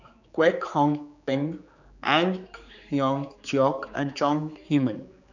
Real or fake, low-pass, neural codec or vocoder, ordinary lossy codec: fake; 7.2 kHz; codec, 16 kHz, 4 kbps, X-Codec, HuBERT features, trained on general audio; none